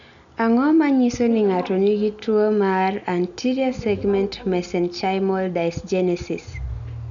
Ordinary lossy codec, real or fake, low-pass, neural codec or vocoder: none; real; 7.2 kHz; none